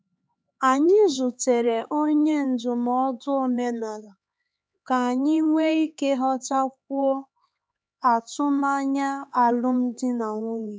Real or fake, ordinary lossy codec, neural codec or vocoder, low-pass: fake; none; codec, 16 kHz, 4 kbps, X-Codec, HuBERT features, trained on LibriSpeech; none